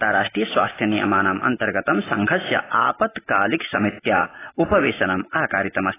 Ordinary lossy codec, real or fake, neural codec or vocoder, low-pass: AAC, 16 kbps; real; none; 3.6 kHz